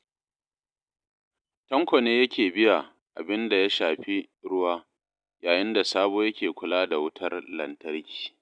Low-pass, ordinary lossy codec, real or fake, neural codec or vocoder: 9.9 kHz; none; real; none